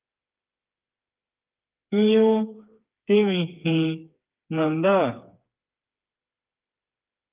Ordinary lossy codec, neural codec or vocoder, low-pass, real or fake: Opus, 24 kbps; codec, 16 kHz, 4 kbps, FreqCodec, smaller model; 3.6 kHz; fake